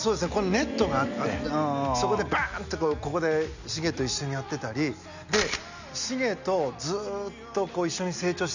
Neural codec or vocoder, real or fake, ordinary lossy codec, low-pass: none; real; none; 7.2 kHz